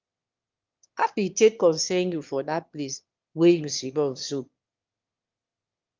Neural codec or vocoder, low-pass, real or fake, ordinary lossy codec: autoencoder, 22.05 kHz, a latent of 192 numbers a frame, VITS, trained on one speaker; 7.2 kHz; fake; Opus, 32 kbps